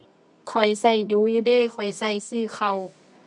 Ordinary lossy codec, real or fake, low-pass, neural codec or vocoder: none; fake; 10.8 kHz; codec, 24 kHz, 0.9 kbps, WavTokenizer, medium music audio release